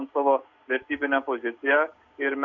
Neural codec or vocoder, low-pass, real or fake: none; 7.2 kHz; real